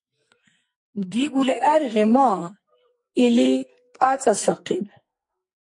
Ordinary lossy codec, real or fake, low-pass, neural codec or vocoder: MP3, 48 kbps; fake; 10.8 kHz; codec, 44.1 kHz, 2.6 kbps, SNAC